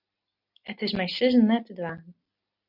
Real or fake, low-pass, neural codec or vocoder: real; 5.4 kHz; none